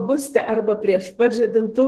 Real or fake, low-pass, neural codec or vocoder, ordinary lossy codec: fake; 14.4 kHz; codec, 32 kHz, 1.9 kbps, SNAC; Opus, 16 kbps